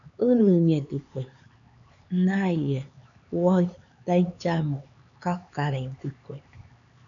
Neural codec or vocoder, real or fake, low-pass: codec, 16 kHz, 4 kbps, X-Codec, HuBERT features, trained on LibriSpeech; fake; 7.2 kHz